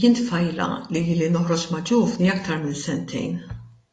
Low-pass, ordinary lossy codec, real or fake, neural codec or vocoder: 10.8 kHz; AAC, 32 kbps; real; none